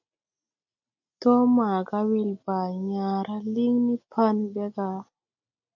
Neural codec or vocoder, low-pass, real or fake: none; 7.2 kHz; real